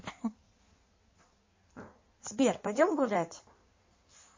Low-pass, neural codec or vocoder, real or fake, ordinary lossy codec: 7.2 kHz; codec, 16 kHz in and 24 kHz out, 1.1 kbps, FireRedTTS-2 codec; fake; MP3, 32 kbps